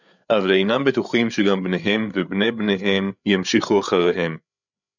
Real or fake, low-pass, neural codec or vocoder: fake; 7.2 kHz; codec, 16 kHz, 8 kbps, FreqCodec, larger model